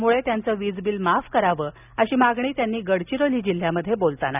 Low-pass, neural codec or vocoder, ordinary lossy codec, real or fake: 3.6 kHz; none; none; real